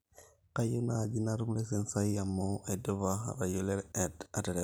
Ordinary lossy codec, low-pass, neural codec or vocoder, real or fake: none; none; none; real